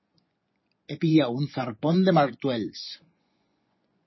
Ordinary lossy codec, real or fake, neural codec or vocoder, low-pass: MP3, 24 kbps; fake; vocoder, 24 kHz, 100 mel bands, Vocos; 7.2 kHz